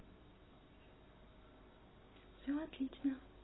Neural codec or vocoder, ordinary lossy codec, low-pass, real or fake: none; AAC, 16 kbps; 7.2 kHz; real